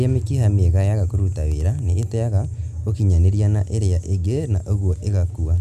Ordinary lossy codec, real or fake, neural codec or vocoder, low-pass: none; real; none; 14.4 kHz